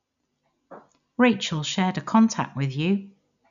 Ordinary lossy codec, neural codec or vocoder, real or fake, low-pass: none; none; real; 7.2 kHz